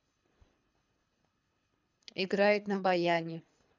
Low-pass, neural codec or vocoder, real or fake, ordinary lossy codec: 7.2 kHz; codec, 24 kHz, 3 kbps, HILCodec; fake; none